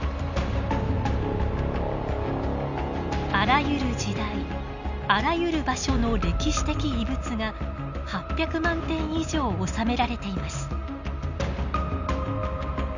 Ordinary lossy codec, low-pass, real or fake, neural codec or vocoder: none; 7.2 kHz; real; none